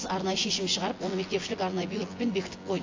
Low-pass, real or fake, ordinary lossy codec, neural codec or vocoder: 7.2 kHz; fake; AAC, 32 kbps; vocoder, 24 kHz, 100 mel bands, Vocos